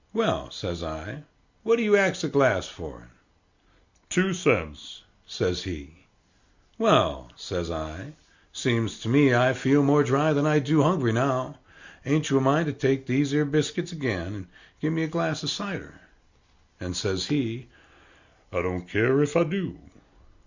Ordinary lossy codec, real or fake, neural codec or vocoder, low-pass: Opus, 64 kbps; real; none; 7.2 kHz